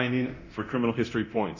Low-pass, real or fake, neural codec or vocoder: 7.2 kHz; fake; codec, 24 kHz, 0.9 kbps, DualCodec